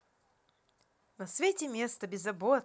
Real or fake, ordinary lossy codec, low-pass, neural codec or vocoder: real; none; none; none